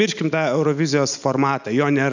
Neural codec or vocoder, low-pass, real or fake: none; 7.2 kHz; real